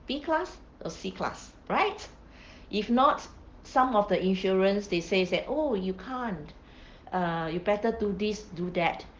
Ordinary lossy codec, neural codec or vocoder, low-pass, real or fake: Opus, 16 kbps; none; 7.2 kHz; real